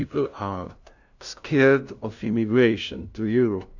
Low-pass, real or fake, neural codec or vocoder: 7.2 kHz; fake; codec, 16 kHz, 0.5 kbps, FunCodec, trained on LibriTTS, 25 frames a second